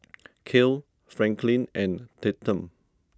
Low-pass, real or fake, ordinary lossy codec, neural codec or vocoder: none; real; none; none